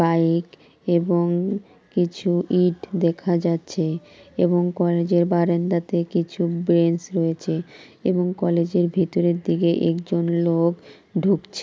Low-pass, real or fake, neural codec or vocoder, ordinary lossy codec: none; real; none; none